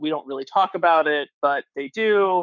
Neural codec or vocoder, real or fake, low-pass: codec, 44.1 kHz, 7.8 kbps, Pupu-Codec; fake; 7.2 kHz